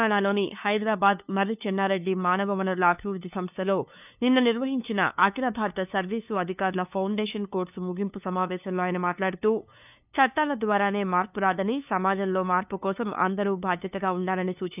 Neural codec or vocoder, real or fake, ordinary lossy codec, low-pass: codec, 16 kHz, 2 kbps, FunCodec, trained on LibriTTS, 25 frames a second; fake; none; 3.6 kHz